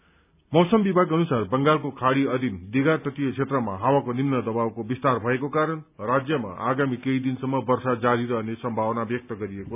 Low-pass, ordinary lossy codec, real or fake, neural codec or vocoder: 3.6 kHz; none; real; none